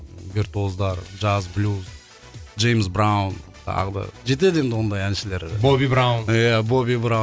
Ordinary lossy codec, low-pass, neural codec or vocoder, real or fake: none; none; none; real